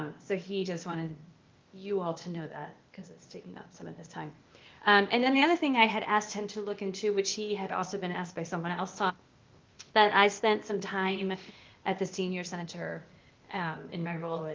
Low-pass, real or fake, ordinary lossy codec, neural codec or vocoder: 7.2 kHz; fake; Opus, 32 kbps; codec, 16 kHz, 0.8 kbps, ZipCodec